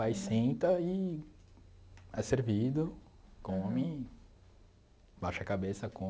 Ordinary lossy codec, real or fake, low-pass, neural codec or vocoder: none; real; none; none